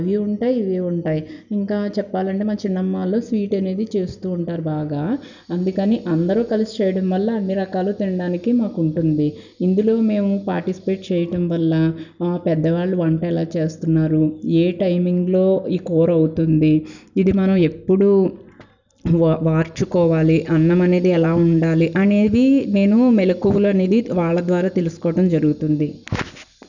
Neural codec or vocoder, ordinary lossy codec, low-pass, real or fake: none; none; 7.2 kHz; real